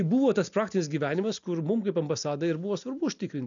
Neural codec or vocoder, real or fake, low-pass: none; real; 7.2 kHz